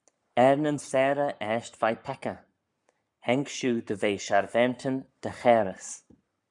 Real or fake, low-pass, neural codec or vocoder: fake; 10.8 kHz; codec, 44.1 kHz, 7.8 kbps, Pupu-Codec